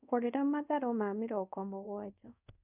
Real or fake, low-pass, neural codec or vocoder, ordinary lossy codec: fake; 3.6 kHz; codec, 24 kHz, 0.5 kbps, DualCodec; none